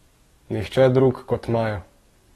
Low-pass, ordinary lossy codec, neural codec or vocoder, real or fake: 19.8 kHz; AAC, 32 kbps; codec, 44.1 kHz, 7.8 kbps, DAC; fake